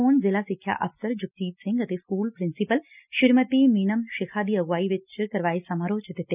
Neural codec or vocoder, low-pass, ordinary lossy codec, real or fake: none; 3.6 kHz; none; real